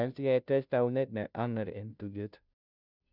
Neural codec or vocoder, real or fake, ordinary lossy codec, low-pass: codec, 16 kHz, 0.5 kbps, FunCodec, trained on Chinese and English, 25 frames a second; fake; none; 5.4 kHz